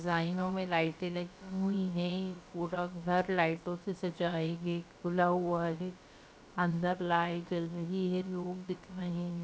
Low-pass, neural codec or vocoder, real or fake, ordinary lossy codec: none; codec, 16 kHz, about 1 kbps, DyCAST, with the encoder's durations; fake; none